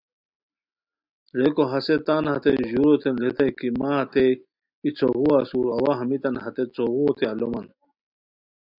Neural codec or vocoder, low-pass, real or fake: none; 5.4 kHz; real